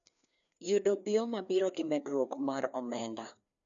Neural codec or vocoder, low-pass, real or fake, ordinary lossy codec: codec, 16 kHz, 2 kbps, FreqCodec, larger model; 7.2 kHz; fake; none